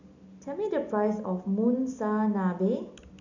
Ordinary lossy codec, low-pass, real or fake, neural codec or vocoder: none; 7.2 kHz; real; none